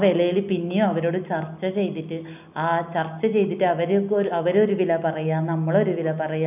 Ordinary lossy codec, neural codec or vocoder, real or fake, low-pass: none; none; real; 3.6 kHz